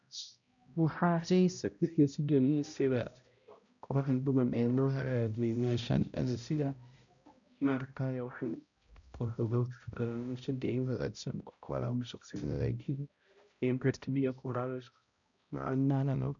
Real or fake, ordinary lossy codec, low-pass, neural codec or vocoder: fake; none; 7.2 kHz; codec, 16 kHz, 0.5 kbps, X-Codec, HuBERT features, trained on balanced general audio